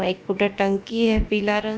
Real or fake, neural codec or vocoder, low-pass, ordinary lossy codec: fake; codec, 16 kHz, about 1 kbps, DyCAST, with the encoder's durations; none; none